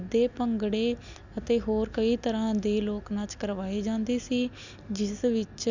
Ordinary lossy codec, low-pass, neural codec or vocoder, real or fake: none; 7.2 kHz; none; real